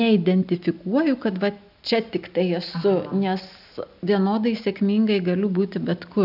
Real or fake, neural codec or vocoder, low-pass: real; none; 5.4 kHz